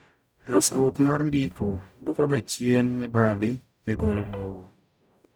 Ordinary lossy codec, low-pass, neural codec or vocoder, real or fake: none; none; codec, 44.1 kHz, 0.9 kbps, DAC; fake